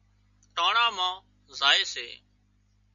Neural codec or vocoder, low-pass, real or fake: none; 7.2 kHz; real